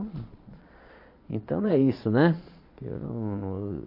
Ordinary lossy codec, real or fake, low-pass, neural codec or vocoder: MP3, 32 kbps; fake; 5.4 kHz; vocoder, 22.05 kHz, 80 mel bands, Vocos